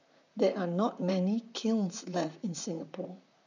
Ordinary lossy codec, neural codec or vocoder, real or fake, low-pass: none; vocoder, 44.1 kHz, 128 mel bands, Pupu-Vocoder; fake; 7.2 kHz